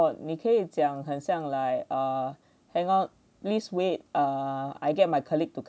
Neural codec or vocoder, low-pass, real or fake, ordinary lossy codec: none; none; real; none